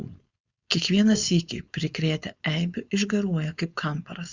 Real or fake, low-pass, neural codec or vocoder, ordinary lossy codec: fake; 7.2 kHz; codec, 16 kHz, 4.8 kbps, FACodec; Opus, 64 kbps